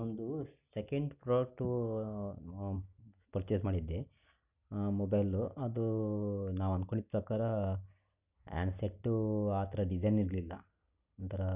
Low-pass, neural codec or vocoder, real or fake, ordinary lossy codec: 3.6 kHz; none; real; none